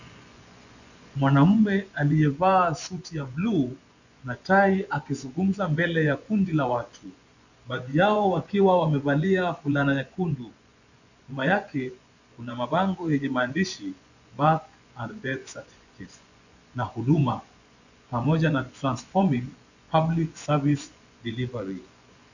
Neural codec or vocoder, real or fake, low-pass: vocoder, 24 kHz, 100 mel bands, Vocos; fake; 7.2 kHz